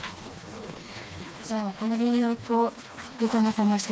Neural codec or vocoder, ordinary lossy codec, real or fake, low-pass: codec, 16 kHz, 2 kbps, FreqCodec, smaller model; none; fake; none